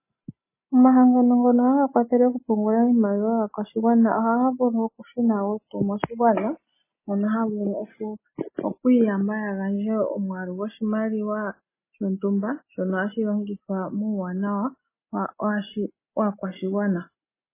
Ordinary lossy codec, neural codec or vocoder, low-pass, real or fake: MP3, 16 kbps; none; 3.6 kHz; real